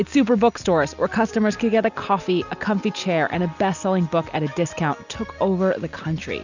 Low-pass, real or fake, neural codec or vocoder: 7.2 kHz; real; none